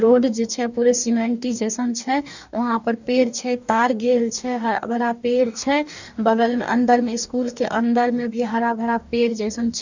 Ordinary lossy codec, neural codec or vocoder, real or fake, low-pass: none; codec, 44.1 kHz, 2.6 kbps, DAC; fake; 7.2 kHz